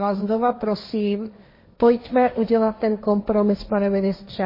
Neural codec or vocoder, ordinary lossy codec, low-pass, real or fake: codec, 16 kHz, 1.1 kbps, Voila-Tokenizer; MP3, 24 kbps; 5.4 kHz; fake